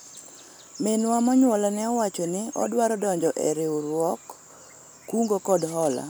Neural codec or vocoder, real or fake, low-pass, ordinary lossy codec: none; real; none; none